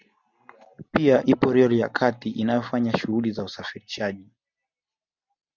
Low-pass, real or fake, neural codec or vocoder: 7.2 kHz; real; none